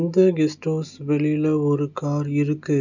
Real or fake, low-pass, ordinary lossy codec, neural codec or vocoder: real; 7.2 kHz; none; none